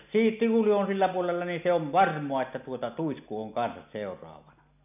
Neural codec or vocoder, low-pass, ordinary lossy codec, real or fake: none; 3.6 kHz; none; real